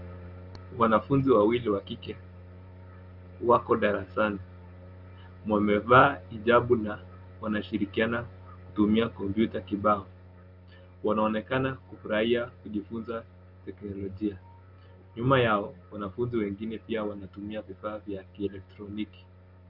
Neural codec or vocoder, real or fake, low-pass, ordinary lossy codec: none; real; 5.4 kHz; Opus, 24 kbps